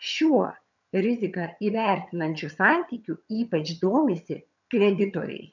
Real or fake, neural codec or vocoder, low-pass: fake; vocoder, 22.05 kHz, 80 mel bands, HiFi-GAN; 7.2 kHz